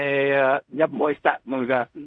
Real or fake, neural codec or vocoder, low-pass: fake; codec, 16 kHz in and 24 kHz out, 0.4 kbps, LongCat-Audio-Codec, fine tuned four codebook decoder; 9.9 kHz